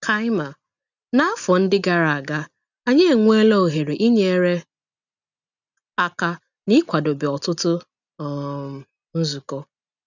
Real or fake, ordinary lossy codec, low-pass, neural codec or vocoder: real; none; 7.2 kHz; none